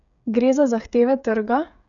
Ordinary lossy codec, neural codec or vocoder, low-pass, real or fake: none; codec, 16 kHz, 8 kbps, FreqCodec, smaller model; 7.2 kHz; fake